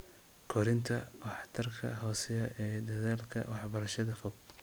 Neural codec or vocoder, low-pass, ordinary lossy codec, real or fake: vocoder, 44.1 kHz, 128 mel bands every 512 samples, BigVGAN v2; none; none; fake